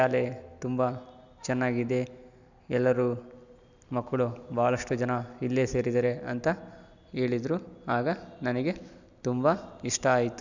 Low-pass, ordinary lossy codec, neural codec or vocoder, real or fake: 7.2 kHz; none; none; real